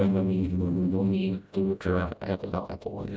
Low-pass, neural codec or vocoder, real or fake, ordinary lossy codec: none; codec, 16 kHz, 0.5 kbps, FreqCodec, smaller model; fake; none